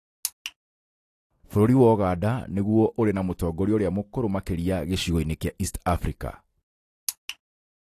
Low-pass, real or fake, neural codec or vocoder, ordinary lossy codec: 14.4 kHz; real; none; AAC, 48 kbps